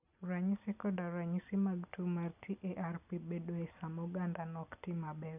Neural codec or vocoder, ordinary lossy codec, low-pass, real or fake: none; none; 3.6 kHz; real